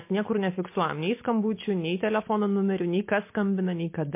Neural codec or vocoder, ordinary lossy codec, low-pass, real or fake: none; MP3, 24 kbps; 3.6 kHz; real